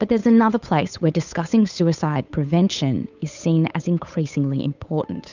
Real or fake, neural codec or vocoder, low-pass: fake; codec, 16 kHz, 8 kbps, FunCodec, trained on Chinese and English, 25 frames a second; 7.2 kHz